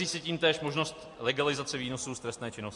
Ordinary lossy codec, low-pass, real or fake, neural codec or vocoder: MP3, 64 kbps; 10.8 kHz; real; none